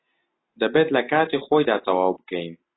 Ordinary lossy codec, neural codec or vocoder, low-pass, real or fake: AAC, 16 kbps; none; 7.2 kHz; real